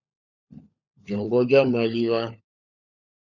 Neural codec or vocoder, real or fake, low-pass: codec, 16 kHz, 16 kbps, FunCodec, trained on LibriTTS, 50 frames a second; fake; 7.2 kHz